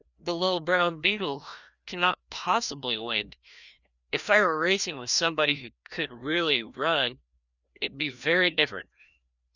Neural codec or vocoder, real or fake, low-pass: codec, 16 kHz, 1 kbps, FreqCodec, larger model; fake; 7.2 kHz